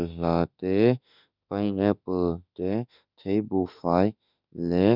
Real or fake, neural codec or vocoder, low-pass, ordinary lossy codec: fake; autoencoder, 48 kHz, 32 numbers a frame, DAC-VAE, trained on Japanese speech; 5.4 kHz; none